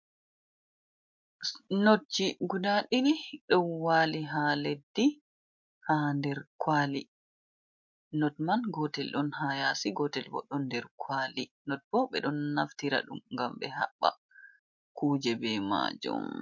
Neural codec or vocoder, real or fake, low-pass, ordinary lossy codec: none; real; 7.2 kHz; MP3, 48 kbps